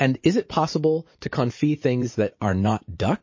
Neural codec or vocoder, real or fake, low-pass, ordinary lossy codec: vocoder, 44.1 kHz, 80 mel bands, Vocos; fake; 7.2 kHz; MP3, 32 kbps